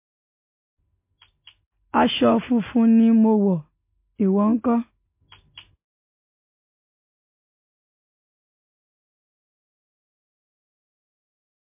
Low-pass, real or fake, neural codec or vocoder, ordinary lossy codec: 3.6 kHz; real; none; MP3, 24 kbps